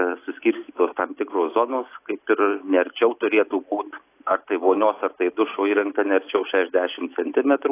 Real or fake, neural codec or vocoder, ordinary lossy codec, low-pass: real; none; AAC, 24 kbps; 3.6 kHz